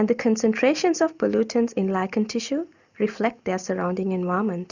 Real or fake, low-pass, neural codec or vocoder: real; 7.2 kHz; none